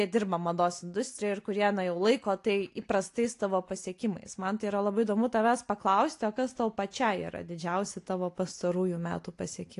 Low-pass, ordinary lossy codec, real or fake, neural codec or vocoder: 10.8 kHz; AAC, 48 kbps; real; none